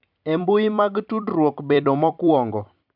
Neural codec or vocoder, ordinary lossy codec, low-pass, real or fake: vocoder, 44.1 kHz, 128 mel bands every 256 samples, BigVGAN v2; none; 5.4 kHz; fake